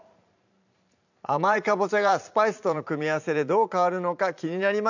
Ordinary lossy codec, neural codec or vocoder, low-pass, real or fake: none; vocoder, 44.1 kHz, 128 mel bands every 512 samples, BigVGAN v2; 7.2 kHz; fake